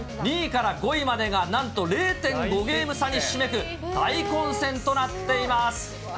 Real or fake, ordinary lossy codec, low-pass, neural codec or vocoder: real; none; none; none